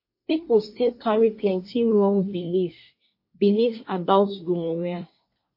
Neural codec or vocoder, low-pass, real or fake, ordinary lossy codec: codec, 24 kHz, 1 kbps, SNAC; 5.4 kHz; fake; MP3, 32 kbps